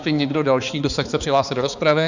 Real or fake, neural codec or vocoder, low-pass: fake; codec, 16 kHz, 4 kbps, X-Codec, HuBERT features, trained on balanced general audio; 7.2 kHz